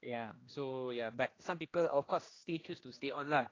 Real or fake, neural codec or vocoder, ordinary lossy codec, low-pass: fake; codec, 16 kHz, 1 kbps, X-Codec, HuBERT features, trained on general audio; AAC, 32 kbps; 7.2 kHz